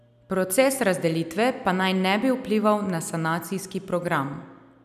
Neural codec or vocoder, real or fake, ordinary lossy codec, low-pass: none; real; none; 14.4 kHz